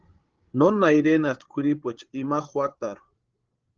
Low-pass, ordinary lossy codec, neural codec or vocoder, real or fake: 7.2 kHz; Opus, 16 kbps; codec, 16 kHz, 8 kbps, FreqCodec, larger model; fake